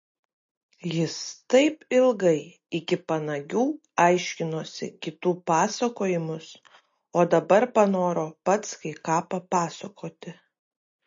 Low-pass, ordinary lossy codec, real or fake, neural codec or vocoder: 7.2 kHz; MP3, 32 kbps; real; none